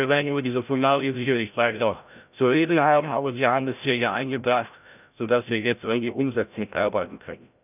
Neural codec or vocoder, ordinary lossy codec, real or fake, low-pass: codec, 16 kHz, 0.5 kbps, FreqCodec, larger model; none; fake; 3.6 kHz